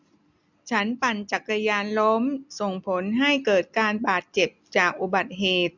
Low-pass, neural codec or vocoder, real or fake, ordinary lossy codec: 7.2 kHz; none; real; none